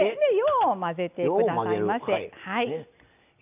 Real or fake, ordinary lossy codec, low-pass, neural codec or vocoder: real; none; 3.6 kHz; none